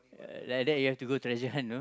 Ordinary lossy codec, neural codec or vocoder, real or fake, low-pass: none; none; real; none